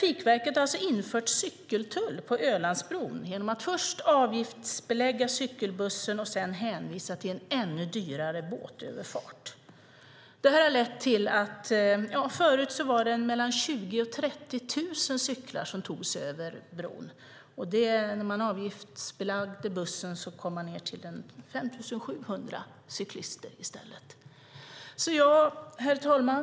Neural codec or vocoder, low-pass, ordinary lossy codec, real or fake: none; none; none; real